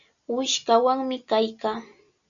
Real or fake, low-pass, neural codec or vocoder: real; 7.2 kHz; none